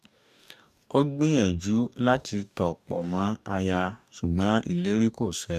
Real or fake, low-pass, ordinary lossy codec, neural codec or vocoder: fake; 14.4 kHz; none; codec, 44.1 kHz, 2.6 kbps, DAC